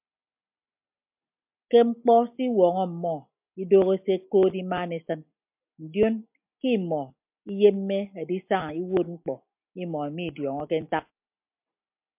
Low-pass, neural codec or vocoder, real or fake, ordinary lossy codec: 3.6 kHz; none; real; MP3, 32 kbps